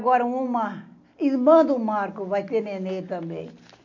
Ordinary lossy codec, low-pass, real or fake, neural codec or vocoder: none; 7.2 kHz; real; none